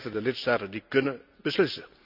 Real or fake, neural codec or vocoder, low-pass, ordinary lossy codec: real; none; 5.4 kHz; none